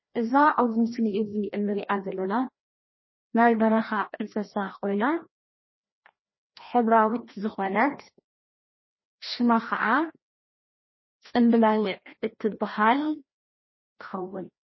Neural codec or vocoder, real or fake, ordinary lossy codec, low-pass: codec, 16 kHz, 1 kbps, FreqCodec, larger model; fake; MP3, 24 kbps; 7.2 kHz